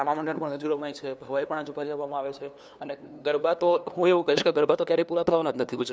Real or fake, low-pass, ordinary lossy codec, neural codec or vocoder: fake; none; none; codec, 16 kHz, 2 kbps, FunCodec, trained on LibriTTS, 25 frames a second